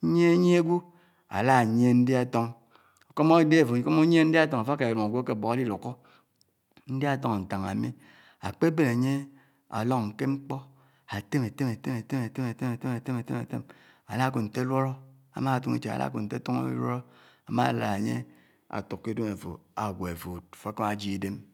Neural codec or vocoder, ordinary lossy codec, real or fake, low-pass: none; none; real; 19.8 kHz